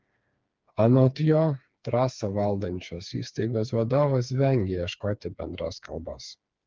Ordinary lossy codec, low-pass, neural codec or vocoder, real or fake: Opus, 32 kbps; 7.2 kHz; codec, 16 kHz, 4 kbps, FreqCodec, smaller model; fake